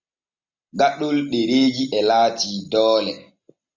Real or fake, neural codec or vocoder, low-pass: real; none; 7.2 kHz